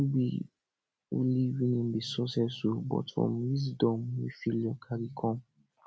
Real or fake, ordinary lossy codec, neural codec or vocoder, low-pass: real; none; none; none